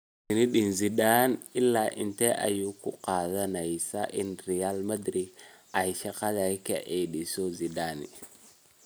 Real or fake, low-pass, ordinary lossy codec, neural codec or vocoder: real; none; none; none